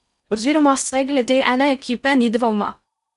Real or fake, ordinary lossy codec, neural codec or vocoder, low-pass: fake; none; codec, 16 kHz in and 24 kHz out, 0.6 kbps, FocalCodec, streaming, 4096 codes; 10.8 kHz